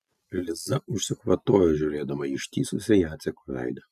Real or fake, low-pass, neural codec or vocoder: real; 14.4 kHz; none